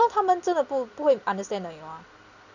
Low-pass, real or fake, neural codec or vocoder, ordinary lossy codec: 7.2 kHz; real; none; none